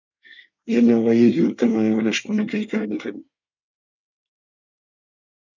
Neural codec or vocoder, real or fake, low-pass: codec, 24 kHz, 1 kbps, SNAC; fake; 7.2 kHz